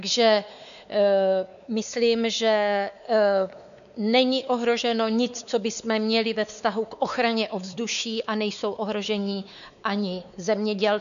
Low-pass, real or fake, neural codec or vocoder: 7.2 kHz; fake; codec, 16 kHz, 4 kbps, X-Codec, WavLM features, trained on Multilingual LibriSpeech